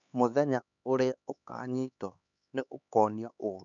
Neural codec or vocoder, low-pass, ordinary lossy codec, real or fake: codec, 16 kHz, 4 kbps, X-Codec, HuBERT features, trained on LibriSpeech; 7.2 kHz; none; fake